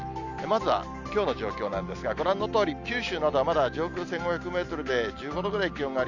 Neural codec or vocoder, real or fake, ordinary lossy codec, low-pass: none; real; none; 7.2 kHz